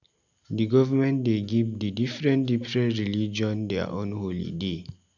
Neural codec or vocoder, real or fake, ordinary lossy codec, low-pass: none; real; none; 7.2 kHz